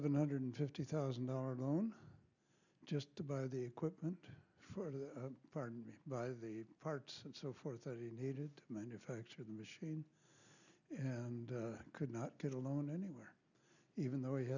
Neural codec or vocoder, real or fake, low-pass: none; real; 7.2 kHz